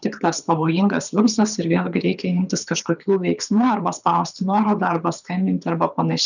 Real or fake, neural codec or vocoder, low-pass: fake; codec, 24 kHz, 6 kbps, HILCodec; 7.2 kHz